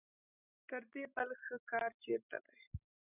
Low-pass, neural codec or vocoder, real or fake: 3.6 kHz; none; real